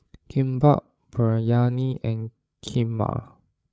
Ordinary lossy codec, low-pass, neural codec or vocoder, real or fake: none; none; codec, 16 kHz, 8 kbps, FreqCodec, larger model; fake